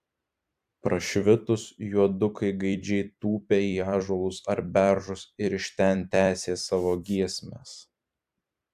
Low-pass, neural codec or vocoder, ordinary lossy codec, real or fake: 14.4 kHz; vocoder, 44.1 kHz, 128 mel bands every 256 samples, BigVGAN v2; AAC, 96 kbps; fake